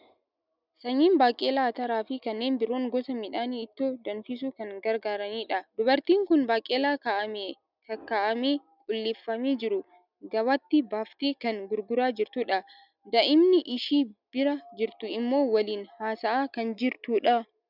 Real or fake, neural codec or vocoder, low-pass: real; none; 5.4 kHz